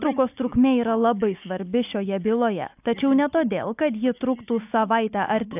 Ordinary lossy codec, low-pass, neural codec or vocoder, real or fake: AAC, 32 kbps; 3.6 kHz; none; real